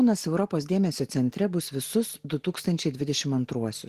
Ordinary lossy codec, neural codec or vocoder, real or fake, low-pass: Opus, 16 kbps; none; real; 14.4 kHz